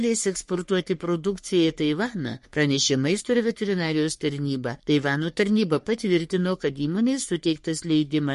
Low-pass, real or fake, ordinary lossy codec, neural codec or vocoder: 14.4 kHz; fake; MP3, 48 kbps; codec, 44.1 kHz, 3.4 kbps, Pupu-Codec